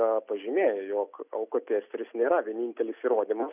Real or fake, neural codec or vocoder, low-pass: real; none; 3.6 kHz